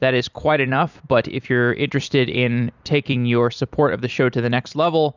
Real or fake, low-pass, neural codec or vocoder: fake; 7.2 kHz; vocoder, 44.1 kHz, 128 mel bands every 256 samples, BigVGAN v2